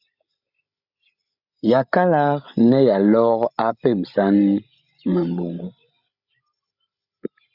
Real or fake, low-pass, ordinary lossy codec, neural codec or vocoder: fake; 5.4 kHz; Opus, 64 kbps; codec, 16 kHz, 16 kbps, FreqCodec, larger model